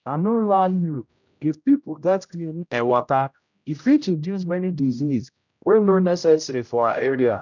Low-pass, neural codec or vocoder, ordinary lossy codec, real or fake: 7.2 kHz; codec, 16 kHz, 0.5 kbps, X-Codec, HuBERT features, trained on general audio; none; fake